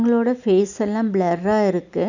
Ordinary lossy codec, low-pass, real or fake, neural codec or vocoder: none; 7.2 kHz; real; none